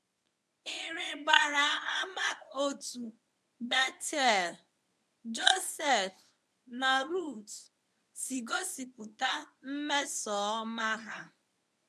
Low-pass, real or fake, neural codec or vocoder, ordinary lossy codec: none; fake; codec, 24 kHz, 0.9 kbps, WavTokenizer, medium speech release version 1; none